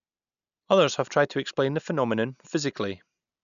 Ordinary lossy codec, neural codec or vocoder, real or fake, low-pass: none; none; real; 7.2 kHz